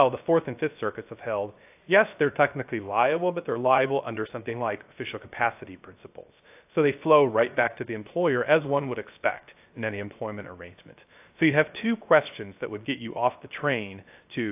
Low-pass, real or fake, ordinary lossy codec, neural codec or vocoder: 3.6 kHz; fake; AAC, 32 kbps; codec, 16 kHz, 0.3 kbps, FocalCodec